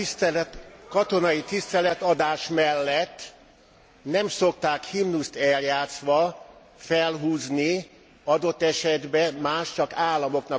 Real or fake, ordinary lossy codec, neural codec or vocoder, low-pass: real; none; none; none